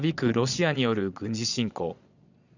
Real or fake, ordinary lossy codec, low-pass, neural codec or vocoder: fake; none; 7.2 kHz; vocoder, 22.05 kHz, 80 mel bands, Vocos